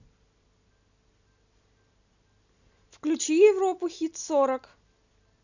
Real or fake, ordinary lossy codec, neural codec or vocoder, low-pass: real; none; none; 7.2 kHz